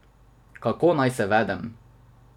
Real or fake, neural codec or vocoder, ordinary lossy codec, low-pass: fake; vocoder, 44.1 kHz, 128 mel bands every 256 samples, BigVGAN v2; none; 19.8 kHz